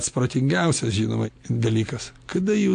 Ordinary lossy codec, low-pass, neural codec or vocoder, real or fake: AAC, 64 kbps; 9.9 kHz; none; real